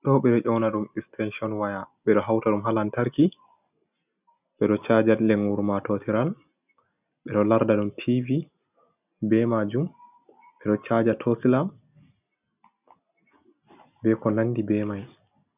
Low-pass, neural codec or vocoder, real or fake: 3.6 kHz; none; real